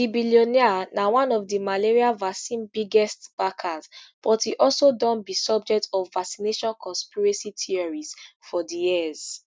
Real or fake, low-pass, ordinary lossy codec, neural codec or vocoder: real; none; none; none